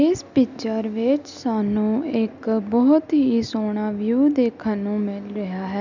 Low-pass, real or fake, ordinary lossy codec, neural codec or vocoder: 7.2 kHz; real; none; none